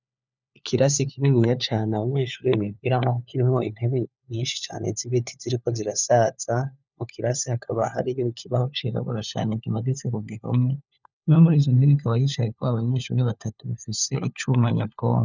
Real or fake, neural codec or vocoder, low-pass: fake; codec, 16 kHz, 4 kbps, FunCodec, trained on LibriTTS, 50 frames a second; 7.2 kHz